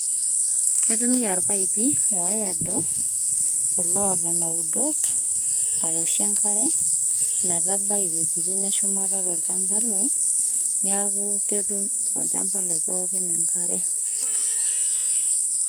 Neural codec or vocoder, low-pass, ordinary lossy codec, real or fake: codec, 44.1 kHz, 2.6 kbps, SNAC; none; none; fake